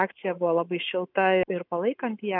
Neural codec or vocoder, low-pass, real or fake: none; 5.4 kHz; real